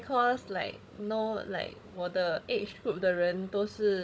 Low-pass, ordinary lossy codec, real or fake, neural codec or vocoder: none; none; fake; codec, 16 kHz, 16 kbps, FunCodec, trained on Chinese and English, 50 frames a second